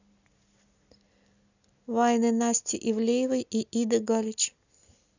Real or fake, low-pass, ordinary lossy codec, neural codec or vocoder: real; 7.2 kHz; none; none